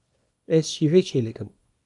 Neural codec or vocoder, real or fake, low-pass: codec, 24 kHz, 0.9 kbps, WavTokenizer, small release; fake; 10.8 kHz